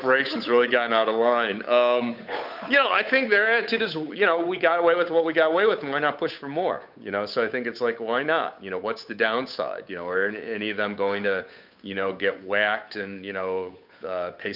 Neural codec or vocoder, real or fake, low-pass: codec, 16 kHz, 8 kbps, FunCodec, trained on Chinese and English, 25 frames a second; fake; 5.4 kHz